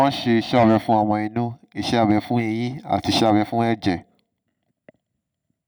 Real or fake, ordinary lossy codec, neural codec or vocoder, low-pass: real; none; none; none